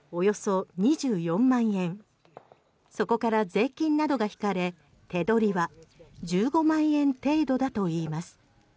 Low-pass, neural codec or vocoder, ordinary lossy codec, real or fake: none; none; none; real